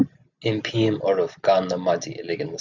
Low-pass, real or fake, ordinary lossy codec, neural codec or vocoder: 7.2 kHz; real; Opus, 64 kbps; none